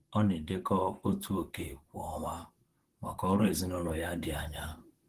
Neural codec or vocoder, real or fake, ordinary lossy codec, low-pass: vocoder, 44.1 kHz, 128 mel bands, Pupu-Vocoder; fake; Opus, 16 kbps; 14.4 kHz